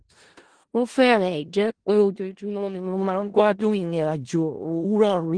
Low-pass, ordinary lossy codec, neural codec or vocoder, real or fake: 9.9 kHz; Opus, 16 kbps; codec, 16 kHz in and 24 kHz out, 0.4 kbps, LongCat-Audio-Codec, four codebook decoder; fake